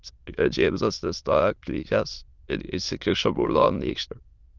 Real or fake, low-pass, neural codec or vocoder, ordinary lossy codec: fake; 7.2 kHz; autoencoder, 22.05 kHz, a latent of 192 numbers a frame, VITS, trained on many speakers; Opus, 32 kbps